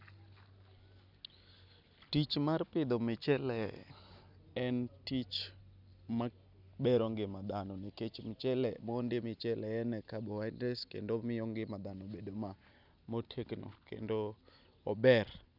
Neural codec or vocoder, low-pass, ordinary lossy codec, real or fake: none; 5.4 kHz; none; real